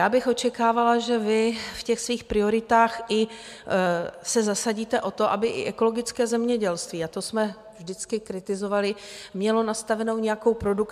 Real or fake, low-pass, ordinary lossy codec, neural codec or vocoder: real; 14.4 kHz; MP3, 96 kbps; none